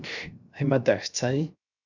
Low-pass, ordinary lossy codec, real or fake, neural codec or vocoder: 7.2 kHz; MP3, 64 kbps; fake; codec, 16 kHz, 0.3 kbps, FocalCodec